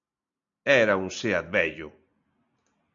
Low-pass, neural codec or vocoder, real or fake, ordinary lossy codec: 7.2 kHz; none; real; MP3, 96 kbps